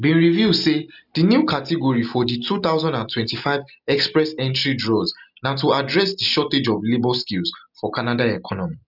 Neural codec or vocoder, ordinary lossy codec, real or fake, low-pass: none; none; real; 5.4 kHz